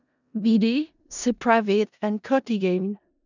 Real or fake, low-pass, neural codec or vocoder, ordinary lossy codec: fake; 7.2 kHz; codec, 16 kHz in and 24 kHz out, 0.4 kbps, LongCat-Audio-Codec, four codebook decoder; none